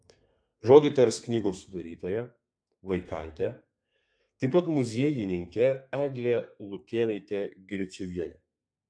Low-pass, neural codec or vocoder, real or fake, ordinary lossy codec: 9.9 kHz; codec, 32 kHz, 1.9 kbps, SNAC; fake; AAC, 64 kbps